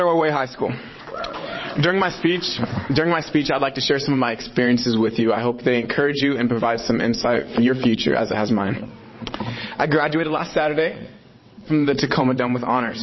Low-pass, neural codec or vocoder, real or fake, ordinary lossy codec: 7.2 kHz; vocoder, 22.05 kHz, 80 mel bands, WaveNeXt; fake; MP3, 24 kbps